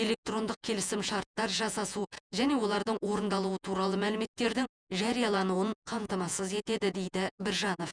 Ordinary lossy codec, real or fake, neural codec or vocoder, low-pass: none; fake; vocoder, 48 kHz, 128 mel bands, Vocos; 9.9 kHz